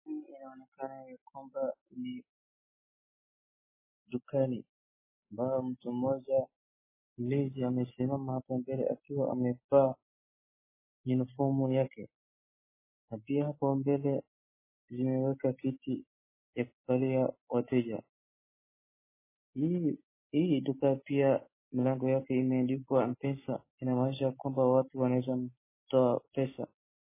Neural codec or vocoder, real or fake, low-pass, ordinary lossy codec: none; real; 3.6 kHz; MP3, 16 kbps